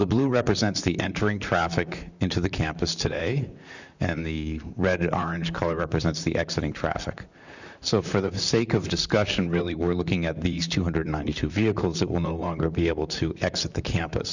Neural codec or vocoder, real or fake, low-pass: vocoder, 44.1 kHz, 128 mel bands, Pupu-Vocoder; fake; 7.2 kHz